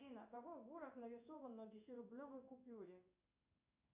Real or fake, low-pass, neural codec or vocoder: fake; 3.6 kHz; codec, 16 kHz in and 24 kHz out, 1 kbps, XY-Tokenizer